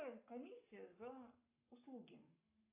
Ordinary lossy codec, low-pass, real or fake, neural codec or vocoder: AAC, 32 kbps; 3.6 kHz; fake; codec, 44.1 kHz, 7.8 kbps, Pupu-Codec